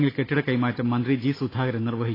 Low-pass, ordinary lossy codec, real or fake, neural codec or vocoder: 5.4 kHz; AAC, 24 kbps; real; none